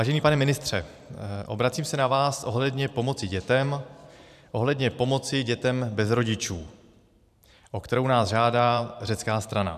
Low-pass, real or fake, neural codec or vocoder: 14.4 kHz; fake; vocoder, 44.1 kHz, 128 mel bands every 512 samples, BigVGAN v2